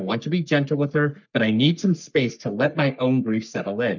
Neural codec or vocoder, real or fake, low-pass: codec, 44.1 kHz, 3.4 kbps, Pupu-Codec; fake; 7.2 kHz